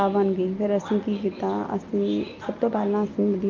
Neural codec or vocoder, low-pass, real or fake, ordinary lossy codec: none; 7.2 kHz; real; Opus, 32 kbps